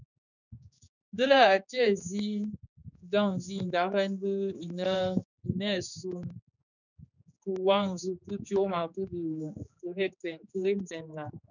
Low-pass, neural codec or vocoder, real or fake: 7.2 kHz; codec, 16 kHz, 4 kbps, X-Codec, HuBERT features, trained on general audio; fake